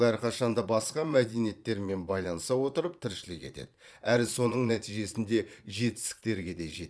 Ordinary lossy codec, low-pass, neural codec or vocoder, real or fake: none; none; vocoder, 22.05 kHz, 80 mel bands, Vocos; fake